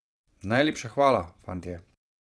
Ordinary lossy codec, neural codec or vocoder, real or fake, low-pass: none; vocoder, 22.05 kHz, 80 mel bands, Vocos; fake; none